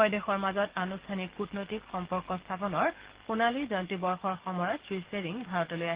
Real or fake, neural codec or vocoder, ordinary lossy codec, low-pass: fake; codec, 44.1 kHz, 7.8 kbps, DAC; Opus, 16 kbps; 3.6 kHz